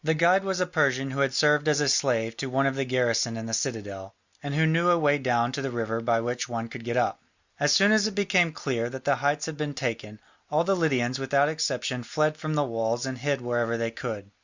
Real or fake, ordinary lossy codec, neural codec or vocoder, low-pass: real; Opus, 64 kbps; none; 7.2 kHz